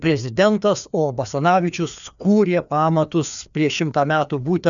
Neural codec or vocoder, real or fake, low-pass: codec, 16 kHz, 2 kbps, FreqCodec, larger model; fake; 7.2 kHz